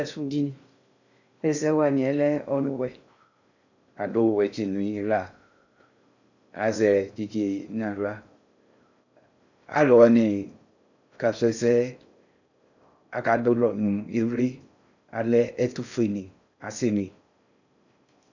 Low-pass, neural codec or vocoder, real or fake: 7.2 kHz; codec, 16 kHz in and 24 kHz out, 0.6 kbps, FocalCodec, streaming, 2048 codes; fake